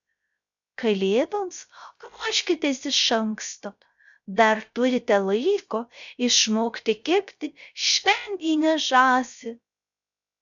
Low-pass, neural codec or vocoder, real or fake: 7.2 kHz; codec, 16 kHz, 0.3 kbps, FocalCodec; fake